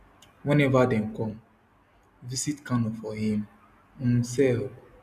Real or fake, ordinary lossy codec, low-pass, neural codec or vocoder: real; none; 14.4 kHz; none